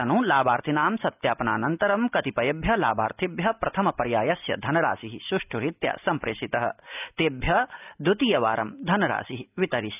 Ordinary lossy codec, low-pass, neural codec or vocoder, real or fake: none; 3.6 kHz; none; real